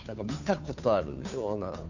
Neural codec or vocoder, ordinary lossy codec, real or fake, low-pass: codec, 16 kHz, 2 kbps, FunCodec, trained on Chinese and English, 25 frames a second; none; fake; 7.2 kHz